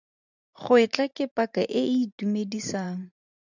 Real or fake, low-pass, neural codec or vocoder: fake; 7.2 kHz; vocoder, 44.1 kHz, 128 mel bands every 512 samples, BigVGAN v2